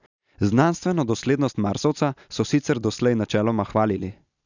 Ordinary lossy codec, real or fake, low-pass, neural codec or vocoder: none; real; 7.2 kHz; none